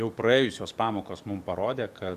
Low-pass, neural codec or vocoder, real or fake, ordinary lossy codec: 14.4 kHz; codec, 44.1 kHz, 7.8 kbps, DAC; fake; Opus, 64 kbps